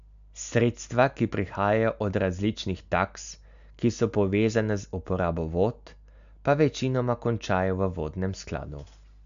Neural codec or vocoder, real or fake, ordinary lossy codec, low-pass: none; real; AAC, 96 kbps; 7.2 kHz